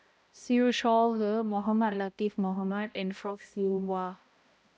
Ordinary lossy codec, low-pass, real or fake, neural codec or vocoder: none; none; fake; codec, 16 kHz, 0.5 kbps, X-Codec, HuBERT features, trained on balanced general audio